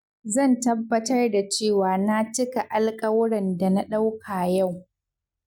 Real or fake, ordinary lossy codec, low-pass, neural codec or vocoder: real; none; 19.8 kHz; none